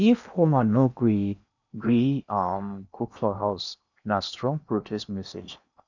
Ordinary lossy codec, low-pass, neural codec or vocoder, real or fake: none; 7.2 kHz; codec, 16 kHz in and 24 kHz out, 0.8 kbps, FocalCodec, streaming, 65536 codes; fake